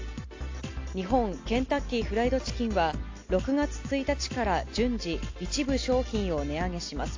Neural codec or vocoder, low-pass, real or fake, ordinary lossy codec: none; 7.2 kHz; real; AAC, 48 kbps